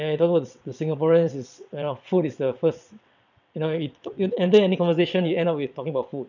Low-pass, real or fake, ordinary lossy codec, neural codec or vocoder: 7.2 kHz; fake; none; vocoder, 22.05 kHz, 80 mel bands, WaveNeXt